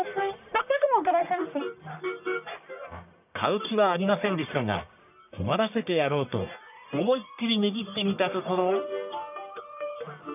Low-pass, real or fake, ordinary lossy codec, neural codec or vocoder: 3.6 kHz; fake; none; codec, 44.1 kHz, 1.7 kbps, Pupu-Codec